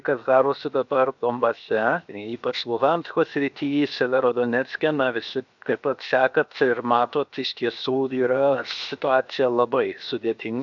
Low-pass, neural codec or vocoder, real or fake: 7.2 kHz; codec, 16 kHz, 0.7 kbps, FocalCodec; fake